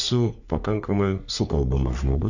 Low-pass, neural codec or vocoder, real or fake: 7.2 kHz; codec, 44.1 kHz, 3.4 kbps, Pupu-Codec; fake